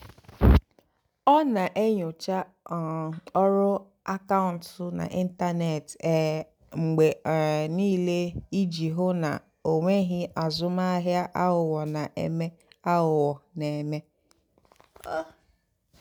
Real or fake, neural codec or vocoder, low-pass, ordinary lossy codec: real; none; none; none